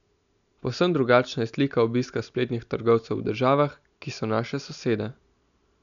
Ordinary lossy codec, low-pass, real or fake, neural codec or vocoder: none; 7.2 kHz; real; none